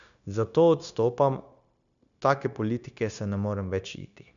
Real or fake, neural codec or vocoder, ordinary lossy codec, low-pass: fake; codec, 16 kHz, 0.9 kbps, LongCat-Audio-Codec; none; 7.2 kHz